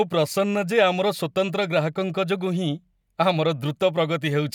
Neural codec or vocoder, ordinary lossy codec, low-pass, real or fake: none; none; 19.8 kHz; real